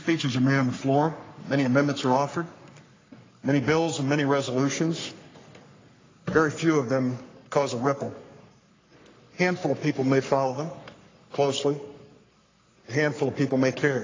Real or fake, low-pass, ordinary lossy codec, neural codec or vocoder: fake; 7.2 kHz; AAC, 32 kbps; codec, 44.1 kHz, 3.4 kbps, Pupu-Codec